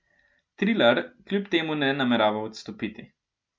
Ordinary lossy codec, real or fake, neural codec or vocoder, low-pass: none; real; none; none